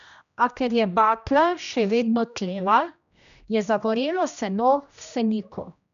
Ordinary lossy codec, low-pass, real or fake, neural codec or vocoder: none; 7.2 kHz; fake; codec, 16 kHz, 1 kbps, X-Codec, HuBERT features, trained on general audio